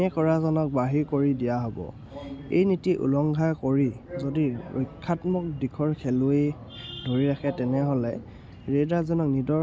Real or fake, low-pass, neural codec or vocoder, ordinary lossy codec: real; none; none; none